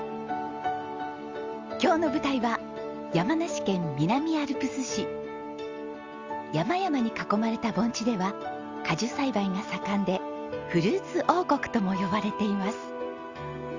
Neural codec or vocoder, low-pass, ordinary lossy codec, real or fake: none; 7.2 kHz; Opus, 32 kbps; real